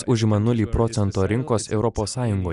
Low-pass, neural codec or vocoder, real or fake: 10.8 kHz; none; real